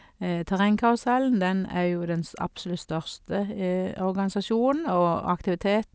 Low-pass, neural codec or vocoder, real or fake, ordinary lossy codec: none; none; real; none